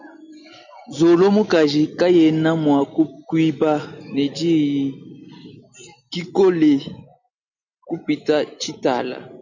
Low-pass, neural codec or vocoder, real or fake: 7.2 kHz; none; real